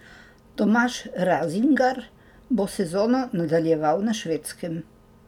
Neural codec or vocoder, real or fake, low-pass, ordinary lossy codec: none; real; 19.8 kHz; none